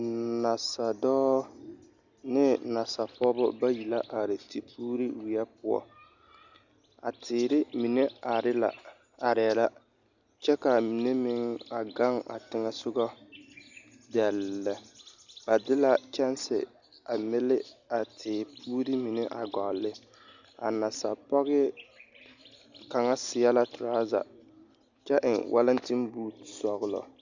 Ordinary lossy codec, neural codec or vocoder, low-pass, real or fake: Opus, 64 kbps; none; 7.2 kHz; real